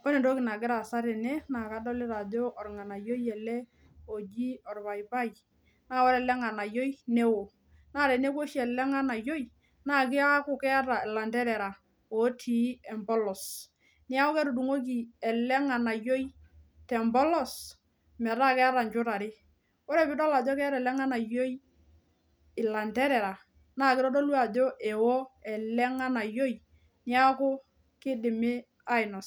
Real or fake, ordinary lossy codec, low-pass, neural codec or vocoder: real; none; none; none